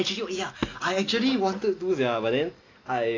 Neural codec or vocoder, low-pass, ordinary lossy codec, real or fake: none; 7.2 kHz; AAC, 32 kbps; real